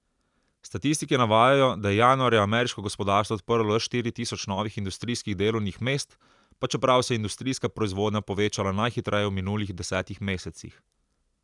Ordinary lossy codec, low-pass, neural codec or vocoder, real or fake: none; 10.8 kHz; none; real